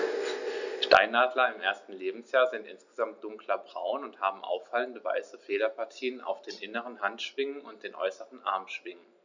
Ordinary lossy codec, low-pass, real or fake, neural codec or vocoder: none; 7.2 kHz; real; none